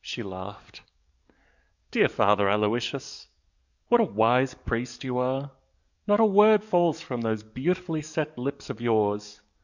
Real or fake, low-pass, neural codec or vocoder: fake; 7.2 kHz; codec, 44.1 kHz, 7.8 kbps, DAC